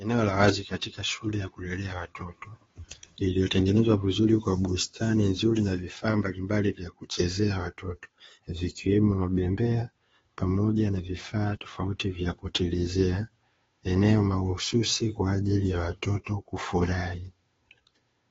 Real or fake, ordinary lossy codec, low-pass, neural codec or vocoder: fake; AAC, 24 kbps; 7.2 kHz; codec, 16 kHz, 2 kbps, FunCodec, trained on Chinese and English, 25 frames a second